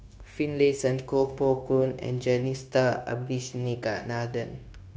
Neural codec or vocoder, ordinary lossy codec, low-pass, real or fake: codec, 16 kHz, 0.9 kbps, LongCat-Audio-Codec; none; none; fake